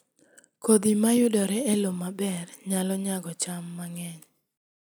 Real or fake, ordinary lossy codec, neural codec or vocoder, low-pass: real; none; none; none